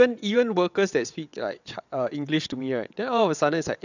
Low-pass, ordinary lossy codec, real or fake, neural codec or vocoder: 7.2 kHz; none; fake; vocoder, 22.05 kHz, 80 mel bands, WaveNeXt